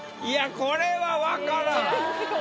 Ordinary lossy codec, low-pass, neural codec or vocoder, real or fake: none; none; none; real